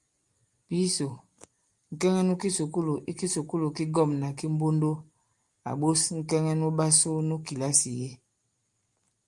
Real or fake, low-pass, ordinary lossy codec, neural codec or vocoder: real; 10.8 kHz; Opus, 32 kbps; none